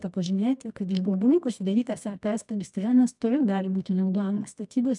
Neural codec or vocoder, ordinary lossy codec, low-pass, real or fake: codec, 24 kHz, 0.9 kbps, WavTokenizer, medium music audio release; MP3, 96 kbps; 10.8 kHz; fake